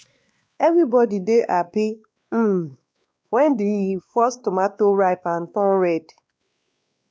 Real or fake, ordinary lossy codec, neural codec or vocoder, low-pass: fake; none; codec, 16 kHz, 2 kbps, X-Codec, WavLM features, trained on Multilingual LibriSpeech; none